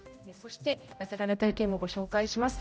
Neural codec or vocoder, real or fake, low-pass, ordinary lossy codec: codec, 16 kHz, 0.5 kbps, X-Codec, HuBERT features, trained on general audio; fake; none; none